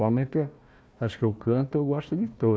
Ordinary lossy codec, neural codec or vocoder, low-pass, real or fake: none; codec, 16 kHz, 1 kbps, FunCodec, trained on Chinese and English, 50 frames a second; none; fake